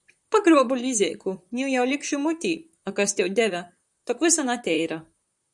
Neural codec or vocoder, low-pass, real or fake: vocoder, 44.1 kHz, 128 mel bands, Pupu-Vocoder; 10.8 kHz; fake